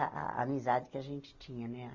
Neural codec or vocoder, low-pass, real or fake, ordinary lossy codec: none; 7.2 kHz; real; MP3, 48 kbps